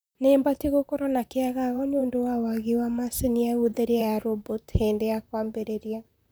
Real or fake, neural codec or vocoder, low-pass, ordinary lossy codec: fake; vocoder, 44.1 kHz, 128 mel bands, Pupu-Vocoder; none; none